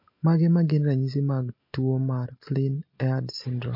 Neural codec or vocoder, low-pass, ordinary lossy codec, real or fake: none; 5.4 kHz; MP3, 32 kbps; real